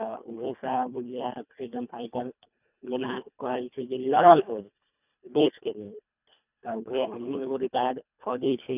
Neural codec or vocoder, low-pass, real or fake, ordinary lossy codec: codec, 24 kHz, 1.5 kbps, HILCodec; 3.6 kHz; fake; none